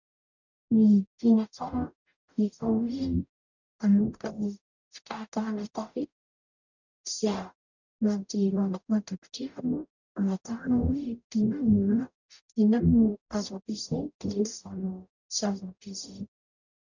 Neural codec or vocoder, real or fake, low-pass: codec, 44.1 kHz, 0.9 kbps, DAC; fake; 7.2 kHz